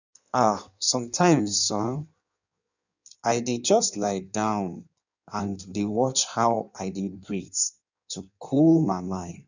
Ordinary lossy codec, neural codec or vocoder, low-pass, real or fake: none; codec, 16 kHz in and 24 kHz out, 1.1 kbps, FireRedTTS-2 codec; 7.2 kHz; fake